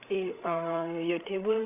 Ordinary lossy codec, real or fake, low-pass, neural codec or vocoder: none; fake; 3.6 kHz; codec, 16 kHz, 8 kbps, FreqCodec, larger model